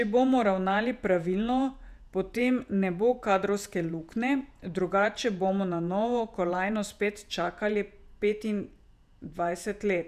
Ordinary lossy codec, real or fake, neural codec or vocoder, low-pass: none; fake; vocoder, 48 kHz, 128 mel bands, Vocos; 14.4 kHz